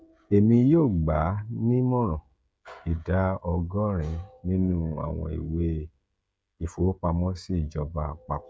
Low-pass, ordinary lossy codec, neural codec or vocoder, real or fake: none; none; codec, 16 kHz, 16 kbps, FreqCodec, smaller model; fake